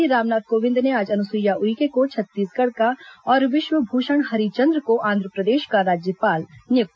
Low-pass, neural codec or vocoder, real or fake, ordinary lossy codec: none; none; real; none